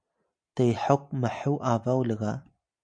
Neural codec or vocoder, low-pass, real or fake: none; 9.9 kHz; real